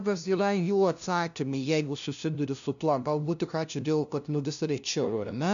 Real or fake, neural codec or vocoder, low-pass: fake; codec, 16 kHz, 0.5 kbps, FunCodec, trained on LibriTTS, 25 frames a second; 7.2 kHz